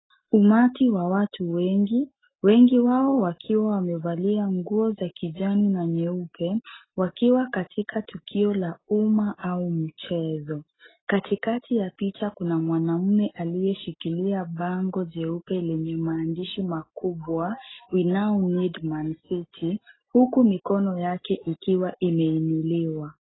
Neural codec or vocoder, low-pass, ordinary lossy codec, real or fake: none; 7.2 kHz; AAC, 16 kbps; real